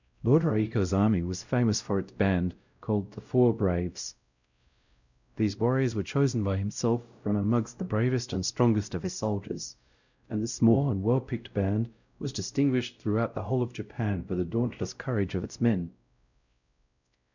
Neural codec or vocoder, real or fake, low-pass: codec, 16 kHz, 0.5 kbps, X-Codec, WavLM features, trained on Multilingual LibriSpeech; fake; 7.2 kHz